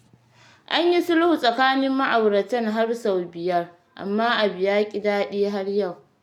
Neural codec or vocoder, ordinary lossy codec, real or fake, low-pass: none; none; real; 19.8 kHz